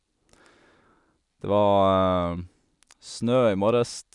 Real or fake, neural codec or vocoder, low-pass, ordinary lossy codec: real; none; 10.8 kHz; none